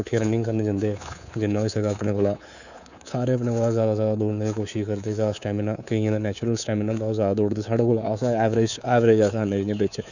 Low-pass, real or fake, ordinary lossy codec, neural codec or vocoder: 7.2 kHz; fake; none; codec, 24 kHz, 3.1 kbps, DualCodec